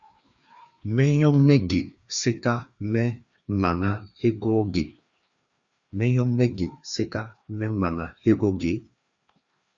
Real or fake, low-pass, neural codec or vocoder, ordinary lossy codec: fake; 7.2 kHz; codec, 16 kHz, 2 kbps, FreqCodec, larger model; Opus, 64 kbps